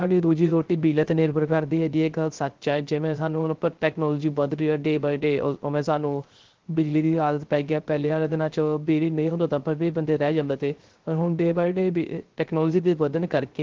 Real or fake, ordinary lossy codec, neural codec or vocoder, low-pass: fake; Opus, 16 kbps; codec, 16 kHz, 0.3 kbps, FocalCodec; 7.2 kHz